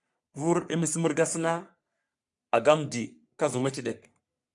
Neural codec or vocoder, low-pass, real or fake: codec, 44.1 kHz, 3.4 kbps, Pupu-Codec; 10.8 kHz; fake